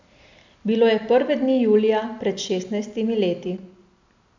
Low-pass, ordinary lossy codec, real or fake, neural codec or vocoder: 7.2 kHz; MP3, 64 kbps; real; none